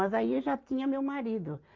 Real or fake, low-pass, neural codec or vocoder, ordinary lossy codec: real; 7.2 kHz; none; Opus, 24 kbps